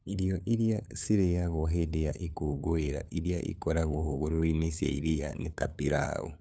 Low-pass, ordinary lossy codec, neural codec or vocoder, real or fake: none; none; codec, 16 kHz, 8 kbps, FunCodec, trained on LibriTTS, 25 frames a second; fake